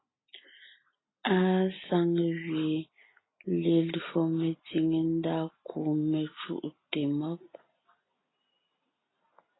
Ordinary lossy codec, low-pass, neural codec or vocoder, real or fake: AAC, 16 kbps; 7.2 kHz; none; real